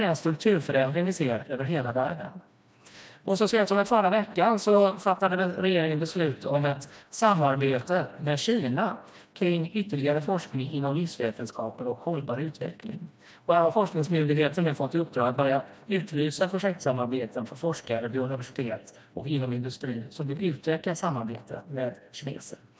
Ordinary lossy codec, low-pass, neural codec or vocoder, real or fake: none; none; codec, 16 kHz, 1 kbps, FreqCodec, smaller model; fake